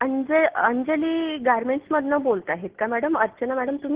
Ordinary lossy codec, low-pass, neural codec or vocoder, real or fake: Opus, 16 kbps; 3.6 kHz; none; real